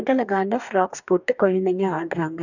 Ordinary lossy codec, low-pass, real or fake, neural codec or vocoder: none; 7.2 kHz; fake; codec, 44.1 kHz, 2.6 kbps, DAC